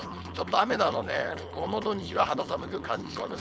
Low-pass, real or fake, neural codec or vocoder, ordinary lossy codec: none; fake; codec, 16 kHz, 4.8 kbps, FACodec; none